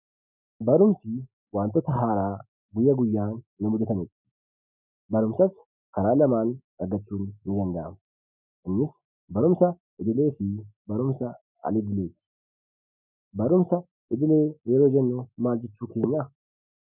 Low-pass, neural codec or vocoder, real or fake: 3.6 kHz; none; real